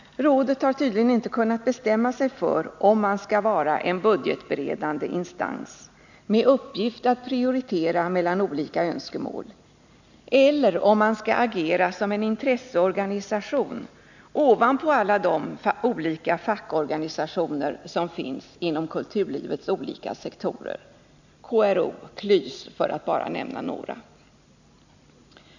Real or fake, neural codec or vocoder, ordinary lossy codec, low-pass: real; none; none; 7.2 kHz